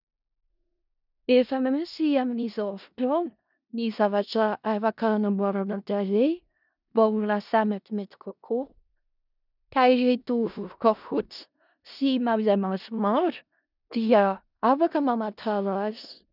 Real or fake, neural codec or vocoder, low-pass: fake; codec, 16 kHz in and 24 kHz out, 0.4 kbps, LongCat-Audio-Codec, four codebook decoder; 5.4 kHz